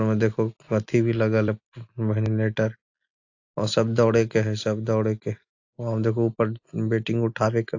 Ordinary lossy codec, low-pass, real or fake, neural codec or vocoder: AAC, 48 kbps; 7.2 kHz; real; none